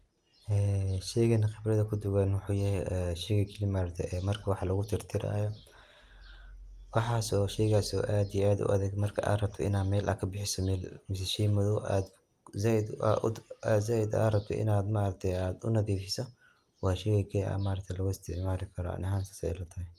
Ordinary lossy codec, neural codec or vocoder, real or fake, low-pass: Opus, 32 kbps; none; real; 14.4 kHz